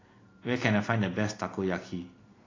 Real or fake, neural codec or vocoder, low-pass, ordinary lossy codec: real; none; 7.2 kHz; AAC, 32 kbps